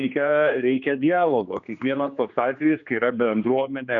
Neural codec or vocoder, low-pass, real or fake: codec, 16 kHz, 2 kbps, X-Codec, HuBERT features, trained on general audio; 7.2 kHz; fake